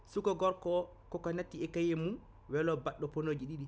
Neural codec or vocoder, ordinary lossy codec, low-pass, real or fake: none; none; none; real